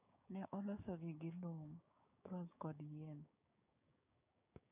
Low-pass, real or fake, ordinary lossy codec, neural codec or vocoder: 3.6 kHz; fake; none; codec, 16 kHz, 6 kbps, DAC